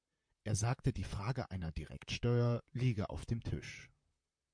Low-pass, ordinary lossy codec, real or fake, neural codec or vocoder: 9.9 kHz; MP3, 64 kbps; fake; vocoder, 44.1 kHz, 128 mel bands, Pupu-Vocoder